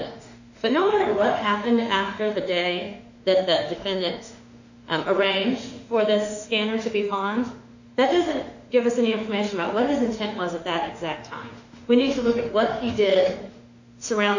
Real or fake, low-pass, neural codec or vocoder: fake; 7.2 kHz; autoencoder, 48 kHz, 32 numbers a frame, DAC-VAE, trained on Japanese speech